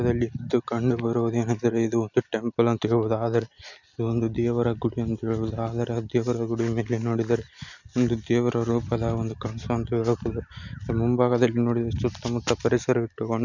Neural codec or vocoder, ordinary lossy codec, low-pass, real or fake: none; none; 7.2 kHz; real